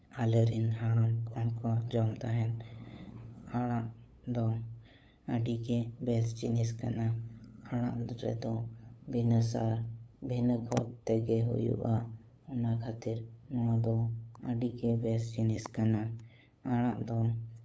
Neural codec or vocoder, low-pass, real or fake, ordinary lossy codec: codec, 16 kHz, 8 kbps, FunCodec, trained on LibriTTS, 25 frames a second; none; fake; none